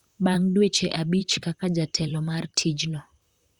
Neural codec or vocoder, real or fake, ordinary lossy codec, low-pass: vocoder, 44.1 kHz, 128 mel bands, Pupu-Vocoder; fake; Opus, 64 kbps; 19.8 kHz